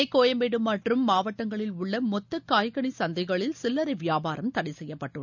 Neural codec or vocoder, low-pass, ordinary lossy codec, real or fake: none; 7.2 kHz; none; real